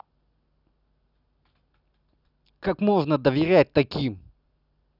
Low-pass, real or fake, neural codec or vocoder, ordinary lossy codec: 5.4 kHz; real; none; none